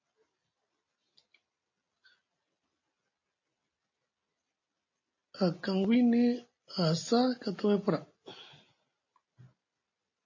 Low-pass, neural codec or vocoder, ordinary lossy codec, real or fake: 7.2 kHz; none; MP3, 32 kbps; real